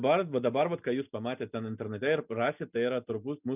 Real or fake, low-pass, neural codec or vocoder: real; 3.6 kHz; none